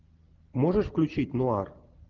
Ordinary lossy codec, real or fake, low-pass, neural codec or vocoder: Opus, 24 kbps; real; 7.2 kHz; none